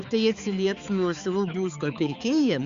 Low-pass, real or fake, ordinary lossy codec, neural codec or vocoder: 7.2 kHz; fake; Opus, 64 kbps; codec, 16 kHz, 4 kbps, X-Codec, HuBERT features, trained on balanced general audio